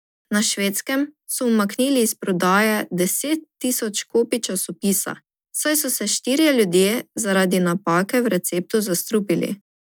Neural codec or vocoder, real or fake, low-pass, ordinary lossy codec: none; real; none; none